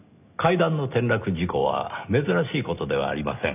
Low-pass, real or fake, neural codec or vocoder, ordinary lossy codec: 3.6 kHz; real; none; none